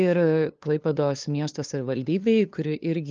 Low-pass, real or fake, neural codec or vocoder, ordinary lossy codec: 7.2 kHz; fake; codec, 16 kHz, 2 kbps, FunCodec, trained on LibriTTS, 25 frames a second; Opus, 24 kbps